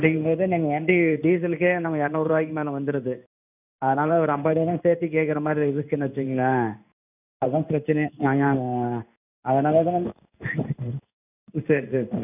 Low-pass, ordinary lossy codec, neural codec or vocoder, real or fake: 3.6 kHz; none; codec, 16 kHz in and 24 kHz out, 1 kbps, XY-Tokenizer; fake